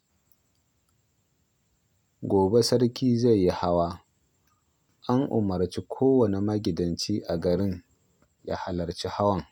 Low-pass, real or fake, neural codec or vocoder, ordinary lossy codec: 19.8 kHz; real; none; none